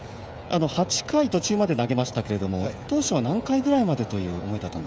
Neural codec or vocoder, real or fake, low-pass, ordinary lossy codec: codec, 16 kHz, 16 kbps, FreqCodec, smaller model; fake; none; none